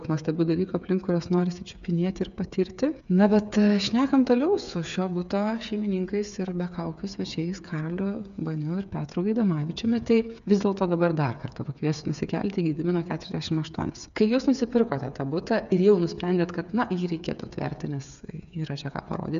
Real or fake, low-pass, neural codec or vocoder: fake; 7.2 kHz; codec, 16 kHz, 8 kbps, FreqCodec, smaller model